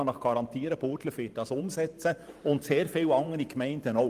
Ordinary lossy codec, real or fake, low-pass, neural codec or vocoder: Opus, 32 kbps; fake; 14.4 kHz; vocoder, 44.1 kHz, 128 mel bands every 512 samples, BigVGAN v2